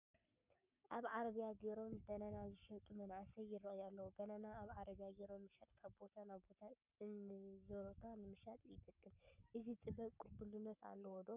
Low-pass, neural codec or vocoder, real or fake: 3.6 kHz; codec, 44.1 kHz, 7.8 kbps, Pupu-Codec; fake